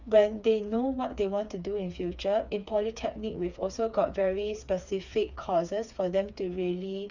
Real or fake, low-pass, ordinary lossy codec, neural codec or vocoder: fake; 7.2 kHz; none; codec, 16 kHz, 4 kbps, FreqCodec, smaller model